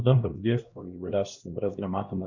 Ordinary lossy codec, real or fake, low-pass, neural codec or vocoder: Opus, 64 kbps; fake; 7.2 kHz; codec, 16 kHz, 1 kbps, X-Codec, HuBERT features, trained on LibriSpeech